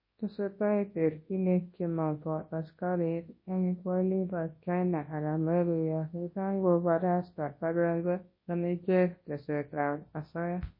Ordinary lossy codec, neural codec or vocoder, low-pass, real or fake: MP3, 24 kbps; codec, 24 kHz, 0.9 kbps, WavTokenizer, large speech release; 5.4 kHz; fake